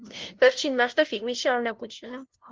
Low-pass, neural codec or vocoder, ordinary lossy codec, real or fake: 7.2 kHz; codec, 16 kHz, 0.5 kbps, FunCodec, trained on LibriTTS, 25 frames a second; Opus, 16 kbps; fake